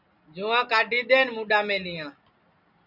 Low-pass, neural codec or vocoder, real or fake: 5.4 kHz; none; real